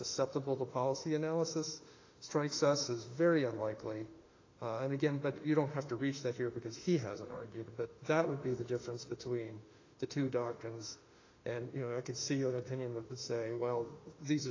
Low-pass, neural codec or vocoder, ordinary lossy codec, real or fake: 7.2 kHz; autoencoder, 48 kHz, 32 numbers a frame, DAC-VAE, trained on Japanese speech; AAC, 32 kbps; fake